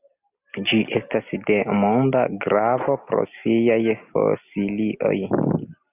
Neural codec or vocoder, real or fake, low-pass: none; real; 3.6 kHz